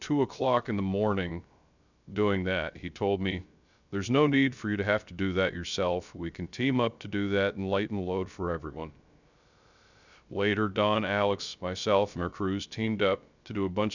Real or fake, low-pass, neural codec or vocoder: fake; 7.2 kHz; codec, 16 kHz, 0.3 kbps, FocalCodec